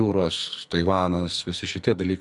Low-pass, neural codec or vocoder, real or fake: 10.8 kHz; codec, 44.1 kHz, 2.6 kbps, SNAC; fake